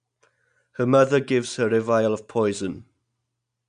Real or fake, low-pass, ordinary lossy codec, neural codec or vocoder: real; 9.9 kHz; none; none